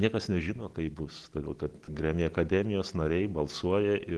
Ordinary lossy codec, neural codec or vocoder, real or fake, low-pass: Opus, 16 kbps; none; real; 10.8 kHz